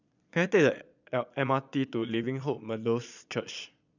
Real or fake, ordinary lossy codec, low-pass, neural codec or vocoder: fake; none; 7.2 kHz; vocoder, 22.05 kHz, 80 mel bands, Vocos